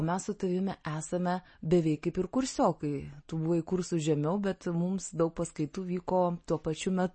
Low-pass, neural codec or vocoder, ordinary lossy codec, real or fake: 9.9 kHz; none; MP3, 32 kbps; real